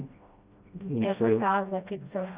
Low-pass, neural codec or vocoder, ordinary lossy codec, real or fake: 3.6 kHz; codec, 16 kHz, 1 kbps, FreqCodec, smaller model; Opus, 64 kbps; fake